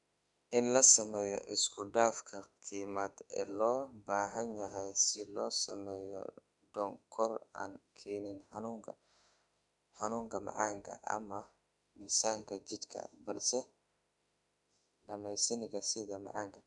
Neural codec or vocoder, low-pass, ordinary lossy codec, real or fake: autoencoder, 48 kHz, 32 numbers a frame, DAC-VAE, trained on Japanese speech; 10.8 kHz; none; fake